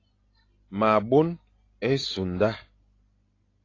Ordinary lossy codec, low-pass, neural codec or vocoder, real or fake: AAC, 32 kbps; 7.2 kHz; none; real